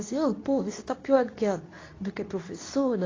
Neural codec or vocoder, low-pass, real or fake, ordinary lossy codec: codec, 24 kHz, 0.9 kbps, WavTokenizer, medium speech release version 2; 7.2 kHz; fake; AAC, 32 kbps